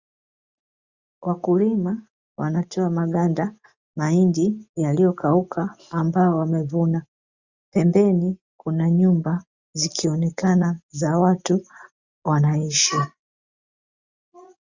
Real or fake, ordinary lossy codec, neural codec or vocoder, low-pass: real; Opus, 64 kbps; none; 7.2 kHz